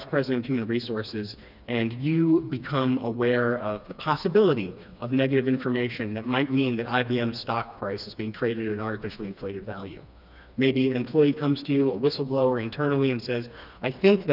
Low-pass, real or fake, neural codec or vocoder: 5.4 kHz; fake; codec, 16 kHz, 2 kbps, FreqCodec, smaller model